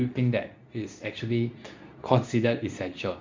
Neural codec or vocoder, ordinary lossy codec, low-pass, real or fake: codec, 24 kHz, 0.9 kbps, WavTokenizer, medium speech release version 2; MP3, 64 kbps; 7.2 kHz; fake